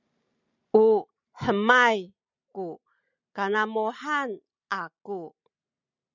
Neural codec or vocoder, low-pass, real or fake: none; 7.2 kHz; real